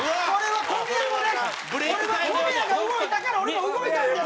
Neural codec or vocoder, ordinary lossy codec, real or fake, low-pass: none; none; real; none